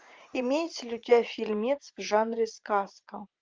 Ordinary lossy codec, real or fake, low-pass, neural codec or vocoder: Opus, 32 kbps; fake; 7.2 kHz; vocoder, 44.1 kHz, 80 mel bands, Vocos